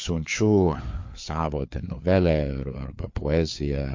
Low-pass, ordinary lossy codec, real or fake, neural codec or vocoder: 7.2 kHz; MP3, 48 kbps; fake; codec, 16 kHz, 4 kbps, FreqCodec, larger model